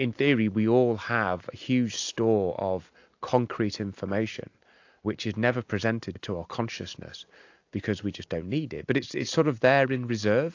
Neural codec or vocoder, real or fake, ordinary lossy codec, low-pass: none; real; AAC, 48 kbps; 7.2 kHz